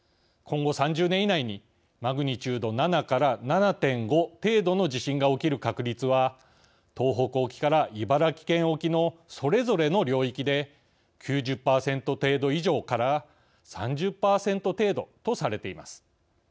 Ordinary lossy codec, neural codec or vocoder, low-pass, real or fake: none; none; none; real